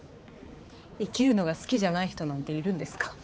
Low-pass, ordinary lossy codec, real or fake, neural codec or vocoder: none; none; fake; codec, 16 kHz, 4 kbps, X-Codec, HuBERT features, trained on balanced general audio